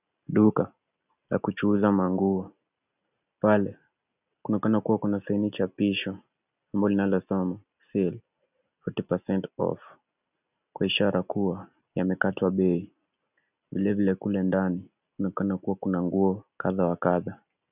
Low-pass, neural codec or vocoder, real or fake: 3.6 kHz; none; real